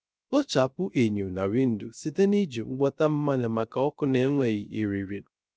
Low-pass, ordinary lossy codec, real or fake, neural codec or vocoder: none; none; fake; codec, 16 kHz, 0.3 kbps, FocalCodec